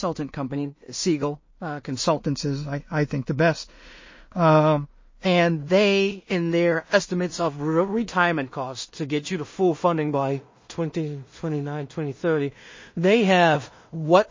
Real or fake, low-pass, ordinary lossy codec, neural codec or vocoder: fake; 7.2 kHz; MP3, 32 kbps; codec, 16 kHz in and 24 kHz out, 0.4 kbps, LongCat-Audio-Codec, two codebook decoder